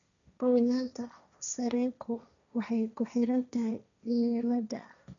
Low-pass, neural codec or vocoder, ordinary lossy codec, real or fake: 7.2 kHz; codec, 16 kHz, 1.1 kbps, Voila-Tokenizer; none; fake